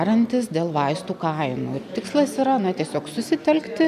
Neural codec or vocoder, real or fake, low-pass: none; real; 14.4 kHz